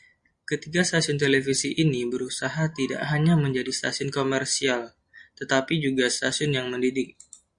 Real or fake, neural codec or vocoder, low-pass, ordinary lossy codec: real; none; 9.9 kHz; Opus, 64 kbps